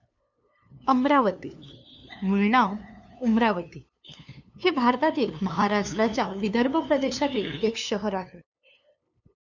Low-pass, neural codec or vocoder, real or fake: 7.2 kHz; codec, 16 kHz, 2 kbps, FunCodec, trained on LibriTTS, 25 frames a second; fake